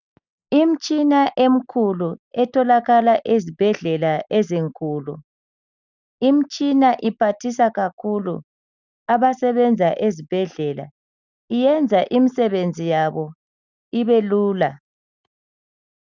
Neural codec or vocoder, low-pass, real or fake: none; 7.2 kHz; real